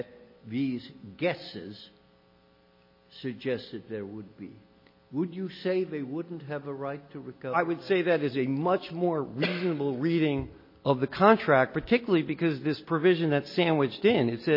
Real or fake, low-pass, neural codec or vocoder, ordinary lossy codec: real; 5.4 kHz; none; MP3, 24 kbps